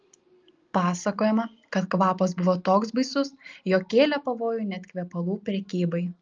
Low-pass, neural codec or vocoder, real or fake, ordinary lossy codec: 7.2 kHz; none; real; Opus, 24 kbps